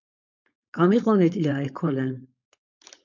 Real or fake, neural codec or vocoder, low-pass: fake; codec, 16 kHz, 4.8 kbps, FACodec; 7.2 kHz